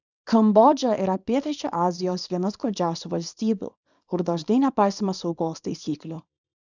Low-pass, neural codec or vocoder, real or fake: 7.2 kHz; codec, 24 kHz, 0.9 kbps, WavTokenizer, small release; fake